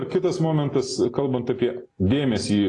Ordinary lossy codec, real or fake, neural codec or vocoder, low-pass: AAC, 32 kbps; real; none; 10.8 kHz